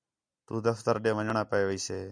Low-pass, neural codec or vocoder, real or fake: 9.9 kHz; none; real